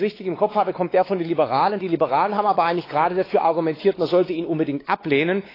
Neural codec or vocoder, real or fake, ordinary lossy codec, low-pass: codec, 16 kHz, 4 kbps, X-Codec, WavLM features, trained on Multilingual LibriSpeech; fake; AAC, 24 kbps; 5.4 kHz